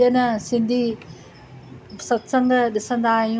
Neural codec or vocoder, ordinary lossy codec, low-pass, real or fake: none; none; none; real